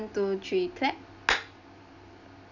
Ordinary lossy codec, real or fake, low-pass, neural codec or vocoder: none; real; 7.2 kHz; none